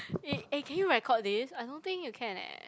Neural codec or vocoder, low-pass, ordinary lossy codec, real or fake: none; none; none; real